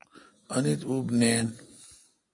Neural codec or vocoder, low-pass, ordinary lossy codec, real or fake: none; 10.8 kHz; AAC, 48 kbps; real